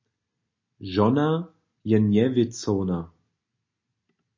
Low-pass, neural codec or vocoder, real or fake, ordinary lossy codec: 7.2 kHz; none; real; MP3, 32 kbps